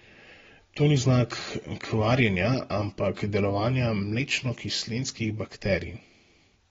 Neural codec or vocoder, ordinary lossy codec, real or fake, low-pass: none; AAC, 24 kbps; real; 7.2 kHz